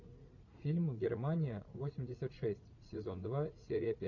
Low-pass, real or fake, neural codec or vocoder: 7.2 kHz; fake; vocoder, 44.1 kHz, 80 mel bands, Vocos